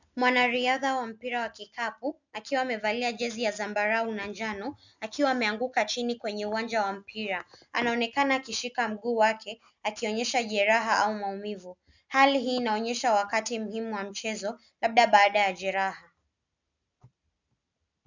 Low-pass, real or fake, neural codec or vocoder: 7.2 kHz; real; none